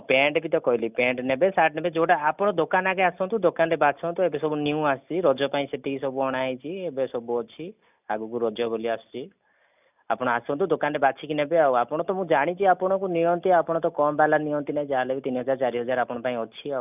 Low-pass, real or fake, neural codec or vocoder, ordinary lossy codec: 3.6 kHz; real; none; none